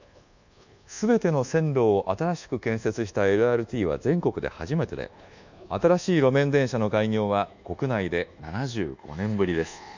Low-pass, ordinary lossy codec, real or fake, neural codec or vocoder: 7.2 kHz; none; fake; codec, 24 kHz, 1.2 kbps, DualCodec